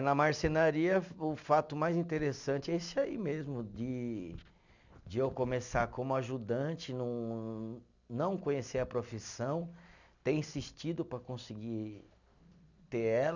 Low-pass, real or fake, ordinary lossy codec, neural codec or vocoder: 7.2 kHz; real; none; none